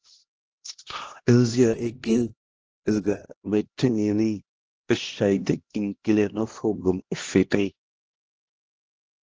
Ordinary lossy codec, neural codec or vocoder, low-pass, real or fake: Opus, 16 kbps; codec, 16 kHz, 1 kbps, X-Codec, HuBERT features, trained on LibriSpeech; 7.2 kHz; fake